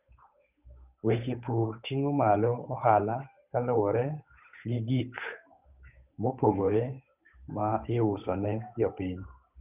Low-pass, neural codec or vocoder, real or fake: 3.6 kHz; codec, 16 kHz, 8 kbps, FunCodec, trained on Chinese and English, 25 frames a second; fake